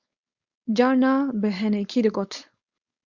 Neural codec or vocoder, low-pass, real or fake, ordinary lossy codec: codec, 16 kHz, 4.8 kbps, FACodec; 7.2 kHz; fake; Opus, 64 kbps